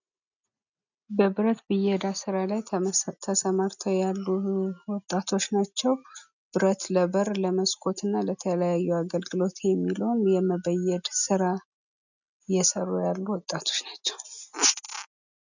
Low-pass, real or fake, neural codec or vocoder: 7.2 kHz; real; none